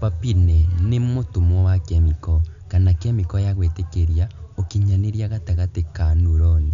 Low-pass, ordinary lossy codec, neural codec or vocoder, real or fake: 7.2 kHz; none; none; real